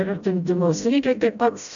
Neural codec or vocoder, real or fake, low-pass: codec, 16 kHz, 0.5 kbps, FreqCodec, smaller model; fake; 7.2 kHz